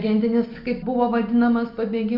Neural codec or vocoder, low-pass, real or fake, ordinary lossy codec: none; 5.4 kHz; real; Opus, 64 kbps